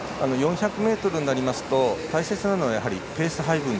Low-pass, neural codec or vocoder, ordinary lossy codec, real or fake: none; none; none; real